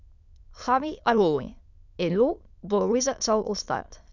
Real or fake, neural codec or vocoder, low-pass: fake; autoencoder, 22.05 kHz, a latent of 192 numbers a frame, VITS, trained on many speakers; 7.2 kHz